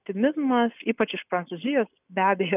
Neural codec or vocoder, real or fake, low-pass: none; real; 3.6 kHz